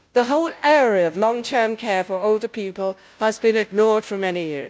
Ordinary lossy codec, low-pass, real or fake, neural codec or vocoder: none; none; fake; codec, 16 kHz, 0.5 kbps, FunCodec, trained on Chinese and English, 25 frames a second